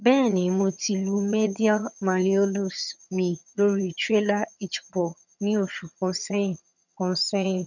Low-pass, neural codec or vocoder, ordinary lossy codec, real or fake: 7.2 kHz; vocoder, 22.05 kHz, 80 mel bands, HiFi-GAN; none; fake